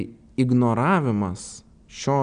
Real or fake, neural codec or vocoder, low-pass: real; none; 9.9 kHz